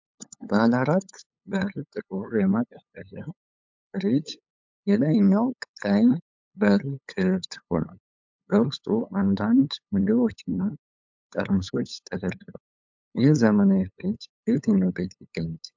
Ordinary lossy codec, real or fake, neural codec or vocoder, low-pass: MP3, 64 kbps; fake; codec, 16 kHz, 8 kbps, FunCodec, trained on LibriTTS, 25 frames a second; 7.2 kHz